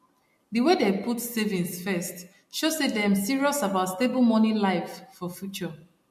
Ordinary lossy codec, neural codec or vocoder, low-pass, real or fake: MP3, 64 kbps; none; 14.4 kHz; real